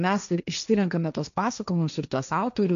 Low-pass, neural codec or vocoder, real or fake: 7.2 kHz; codec, 16 kHz, 1.1 kbps, Voila-Tokenizer; fake